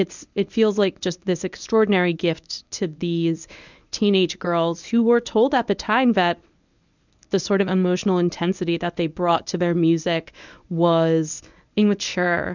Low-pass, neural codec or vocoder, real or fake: 7.2 kHz; codec, 24 kHz, 0.9 kbps, WavTokenizer, medium speech release version 1; fake